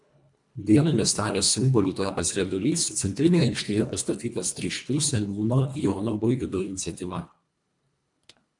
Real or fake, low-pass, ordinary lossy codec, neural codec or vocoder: fake; 10.8 kHz; MP3, 96 kbps; codec, 24 kHz, 1.5 kbps, HILCodec